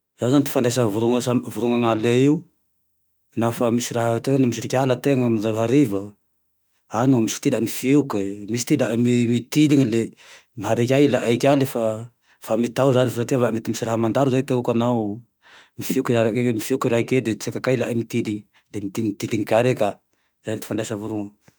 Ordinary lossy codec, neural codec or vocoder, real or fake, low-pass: none; autoencoder, 48 kHz, 32 numbers a frame, DAC-VAE, trained on Japanese speech; fake; none